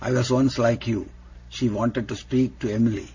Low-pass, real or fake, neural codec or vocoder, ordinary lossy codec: 7.2 kHz; real; none; AAC, 48 kbps